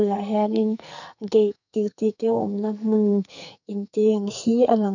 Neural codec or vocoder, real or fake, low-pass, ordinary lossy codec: codec, 44.1 kHz, 2.6 kbps, SNAC; fake; 7.2 kHz; none